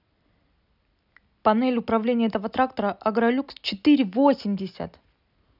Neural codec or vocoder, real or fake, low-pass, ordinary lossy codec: none; real; 5.4 kHz; none